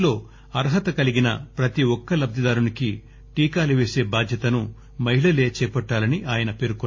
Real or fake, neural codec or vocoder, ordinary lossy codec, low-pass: real; none; MP3, 32 kbps; 7.2 kHz